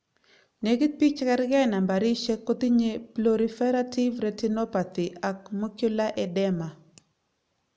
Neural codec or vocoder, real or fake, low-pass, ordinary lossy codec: none; real; none; none